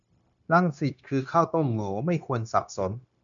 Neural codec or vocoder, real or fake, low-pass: codec, 16 kHz, 0.9 kbps, LongCat-Audio-Codec; fake; 7.2 kHz